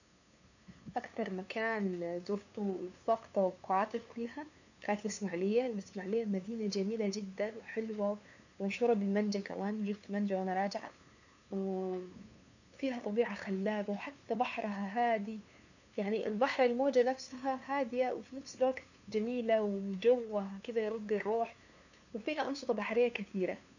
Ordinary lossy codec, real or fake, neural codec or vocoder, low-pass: none; fake; codec, 16 kHz, 2 kbps, FunCodec, trained on LibriTTS, 25 frames a second; 7.2 kHz